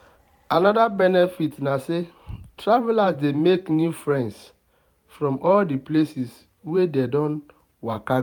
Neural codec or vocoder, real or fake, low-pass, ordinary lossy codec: vocoder, 44.1 kHz, 128 mel bands every 512 samples, BigVGAN v2; fake; 19.8 kHz; none